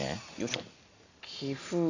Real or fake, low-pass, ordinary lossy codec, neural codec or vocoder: real; 7.2 kHz; AAC, 48 kbps; none